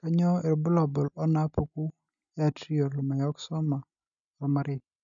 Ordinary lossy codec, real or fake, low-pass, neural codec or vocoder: none; real; 7.2 kHz; none